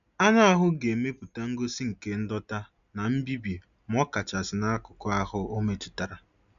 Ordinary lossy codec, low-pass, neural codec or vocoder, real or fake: none; 7.2 kHz; none; real